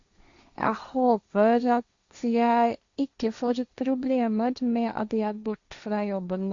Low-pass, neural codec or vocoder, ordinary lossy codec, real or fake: 7.2 kHz; codec, 16 kHz, 1.1 kbps, Voila-Tokenizer; Opus, 64 kbps; fake